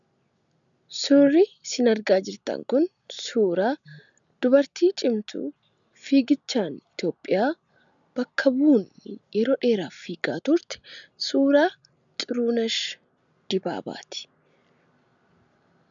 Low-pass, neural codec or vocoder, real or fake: 7.2 kHz; none; real